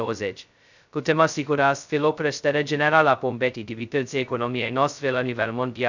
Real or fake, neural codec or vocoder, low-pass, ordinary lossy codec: fake; codec, 16 kHz, 0.2 kbps, FocalCodec; 7.2 kHz; none